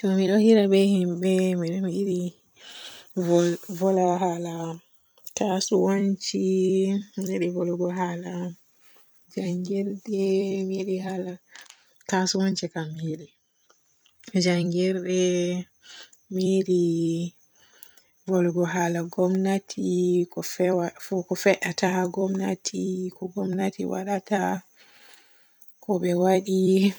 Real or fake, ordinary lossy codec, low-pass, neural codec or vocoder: fake; none; none; vocoder, 44.1 kHz, 128 mel bands every 256 samples, BigVGAN v2